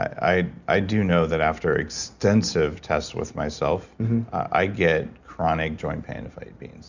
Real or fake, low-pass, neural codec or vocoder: real; 7.2 kHz; none